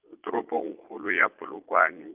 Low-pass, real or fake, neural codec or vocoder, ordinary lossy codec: 3.6 kHz; fake; vocoder, 22.05 kHz, 80 mel bands, Vocos; Opus, 24 kbps